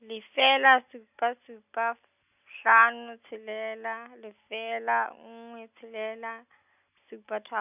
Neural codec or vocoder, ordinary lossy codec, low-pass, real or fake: none; none; 3.6 kHz; real